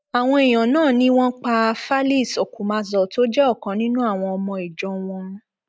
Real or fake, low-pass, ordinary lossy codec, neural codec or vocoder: real; none; none; none